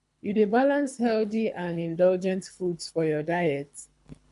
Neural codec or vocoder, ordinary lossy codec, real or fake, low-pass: codec, 24 kHz, 3 kbps, HILCodec; MP3, 96 kbps; fake; 10.8 kHz